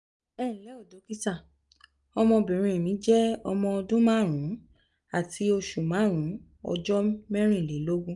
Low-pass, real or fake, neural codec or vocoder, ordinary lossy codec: 10.8 kHz; real; none; none